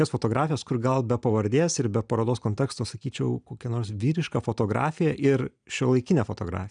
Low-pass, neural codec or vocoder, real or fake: 9.9 kHz; none; real